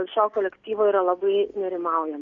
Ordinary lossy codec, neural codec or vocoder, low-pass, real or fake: Opus, 64 kbps; none; 7.2 kHz; real